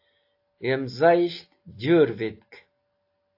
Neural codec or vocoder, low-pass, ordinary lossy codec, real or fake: none; 5.4 kHz; AAC, 32 kbps; real